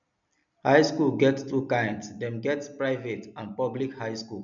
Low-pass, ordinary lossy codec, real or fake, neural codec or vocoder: 7.2 kHz; none; real; none